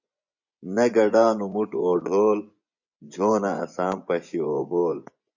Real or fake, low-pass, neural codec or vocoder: fake; 7.2 kHz; vocoder, 24 kHz, 100 mel bands, Vocos